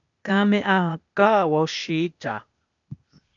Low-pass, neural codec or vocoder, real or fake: 7.2 kHz; codec, 16 kHz, 0.8 kbps, ZipCodec; fake